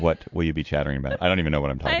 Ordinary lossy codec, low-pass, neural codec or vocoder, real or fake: MP3, 64 kbps; 7.2 kHz; none; real